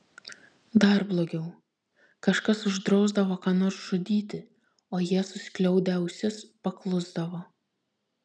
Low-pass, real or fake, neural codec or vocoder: 9.9 kHz; real; none